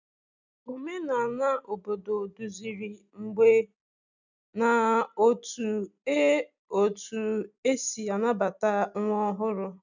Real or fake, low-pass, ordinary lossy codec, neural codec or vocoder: real; 7.2 kHz; none; none